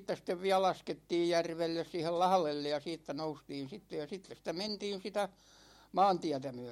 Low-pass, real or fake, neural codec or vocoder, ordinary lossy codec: 19.8 kHz; real; none; MP3, 64 kbps